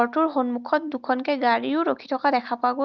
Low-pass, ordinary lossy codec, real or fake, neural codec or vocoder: 7.2 kHz; Opus, 24 kbps; real; none